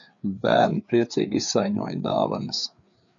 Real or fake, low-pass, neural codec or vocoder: fake; 7.2 kHz; codec, 16 kHz, 4 kbps, FreqCodec, larger model